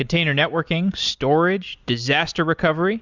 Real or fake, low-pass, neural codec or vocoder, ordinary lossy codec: real; 7.2 kHz; none; Opus, 64 kbps